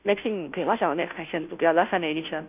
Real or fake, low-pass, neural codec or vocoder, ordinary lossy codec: fake; 3.6 kHz; codec, 16 kHz, 0.5 kbps, FunCodec, trained on Chinese and English, 25 frames a second; none